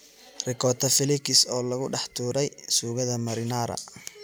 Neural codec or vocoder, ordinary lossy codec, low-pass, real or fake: none; none; none; real